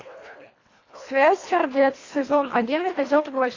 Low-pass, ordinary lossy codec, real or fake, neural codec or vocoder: 7.2 kHz; AAC, 32 kbps; fake; codec, 24 kHz, 1.5 kbps, HILCodec